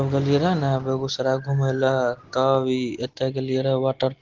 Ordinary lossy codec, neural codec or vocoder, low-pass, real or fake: Opus, 16 kbps; none; 7.2 kHz; real